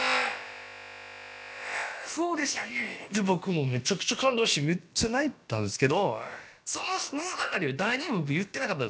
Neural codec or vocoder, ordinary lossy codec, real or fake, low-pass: codec, 16 kHz, about 1 kbps, DyCAST, with the encoder's durations; none; fake; none